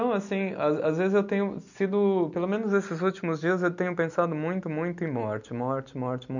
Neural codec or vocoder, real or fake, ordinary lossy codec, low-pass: none; real; none; 7.2 kHz